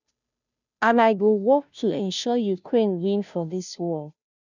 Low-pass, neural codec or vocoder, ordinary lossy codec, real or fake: 7.2 kHz; codec, 16 kHz, 0.5 kbps, FunCodec, trained on Chinese and English, 25 frames a second; none; fake